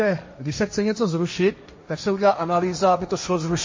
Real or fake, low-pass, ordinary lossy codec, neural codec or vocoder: fake; 7.2 kHz; MP3, 32 kbps; codec, 16 kHz, 1.1 kbps, Voila-Tokenizer